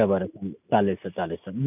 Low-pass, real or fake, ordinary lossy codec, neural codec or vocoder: 3.6 kHz; real; none; none